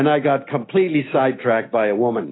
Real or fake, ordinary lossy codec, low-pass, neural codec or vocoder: real; AAC, 16 kbps; 7.2 kHz; none